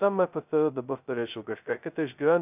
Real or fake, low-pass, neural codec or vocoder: fake; 3.6 kHz; codec, 16 kHz, 0.2 kbps, FocalCodec